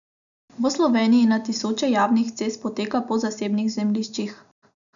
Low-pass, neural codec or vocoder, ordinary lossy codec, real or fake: 7.2 kHz; none; none; real